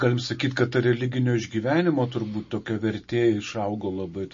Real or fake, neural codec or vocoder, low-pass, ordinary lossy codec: real; none; 7.2 kHz; MP3, 32 kbps